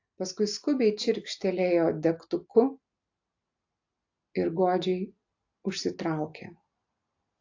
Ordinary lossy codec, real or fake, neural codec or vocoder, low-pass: AAC, 48 kbps; real; none; 7.2 kHz